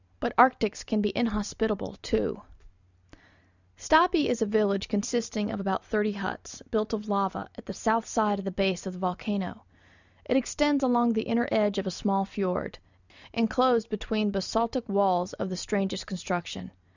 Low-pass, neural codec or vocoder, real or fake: 7.2 kHz; none; real